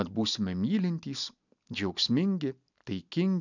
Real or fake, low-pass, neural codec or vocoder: real; 7.2 kHz; none